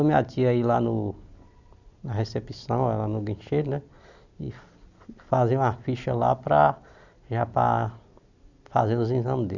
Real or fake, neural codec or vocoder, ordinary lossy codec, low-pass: real; none; none; 7.2 kHz